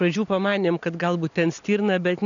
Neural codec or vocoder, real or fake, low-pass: none; real; 7.2 kHz